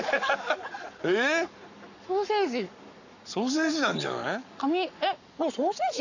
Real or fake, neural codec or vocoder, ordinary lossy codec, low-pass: fake; codec, 44.1 kHz, 7.8 kbps, Pupu-Codec; none; 7.2 kHz